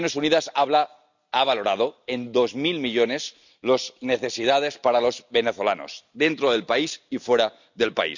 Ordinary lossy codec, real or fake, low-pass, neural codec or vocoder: none; real; 7.2 kHz; none